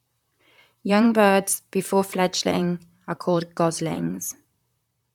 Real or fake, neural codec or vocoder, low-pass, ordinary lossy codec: fake; vocoder, 44.1 kHz, 128 mel bands, Pupu-Vocoder; 19.8 kHz; none